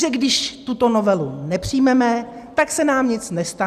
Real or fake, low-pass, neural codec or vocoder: real; 14.4 kHz; none